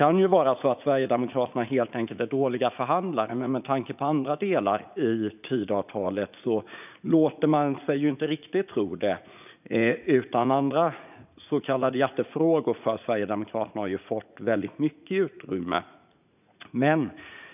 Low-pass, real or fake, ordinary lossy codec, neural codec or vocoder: 3.6 kHz; fake; none; vocoder, 44.1 kHz, 80 mel bands, Vocos